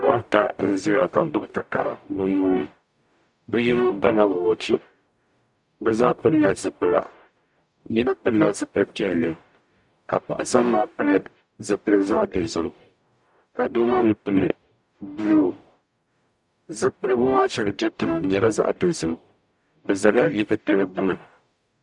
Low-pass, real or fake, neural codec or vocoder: 10.8 kHz; fake; codec, 44.1 kHz, 0.9 kbps, DAC